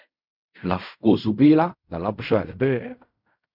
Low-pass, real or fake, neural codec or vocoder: 5.4 kHz; fake; codec, 16 kHz in and 24 kHz out, 0.4 kbps, LongCat-Audio-Codec, fine tuned four codebook decoder